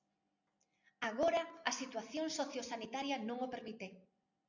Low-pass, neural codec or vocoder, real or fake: 7.2 kHz; none; real